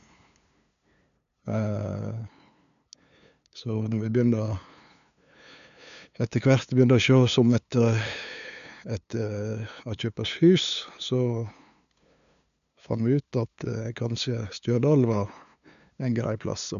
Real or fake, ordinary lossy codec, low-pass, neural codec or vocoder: fake; none; 7.2 kHz; codec, 16 kHz, 2 kbps, FunCodec, trained on LibriTTS, 25 frames a second